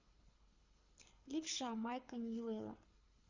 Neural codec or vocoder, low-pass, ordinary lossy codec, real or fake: codec, 24 kHz, 6 kbps, HILCodec; 7.2 kHz; Opus, 64 kbps; fake